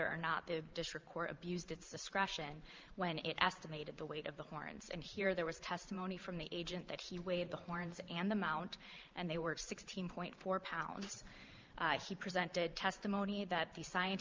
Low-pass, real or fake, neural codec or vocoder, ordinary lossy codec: 7.2 kHz; fake; vocoder, 44.1 kHz, 128 mel bands every 512 samples, BigVGAN v2; Opus, 24 kbps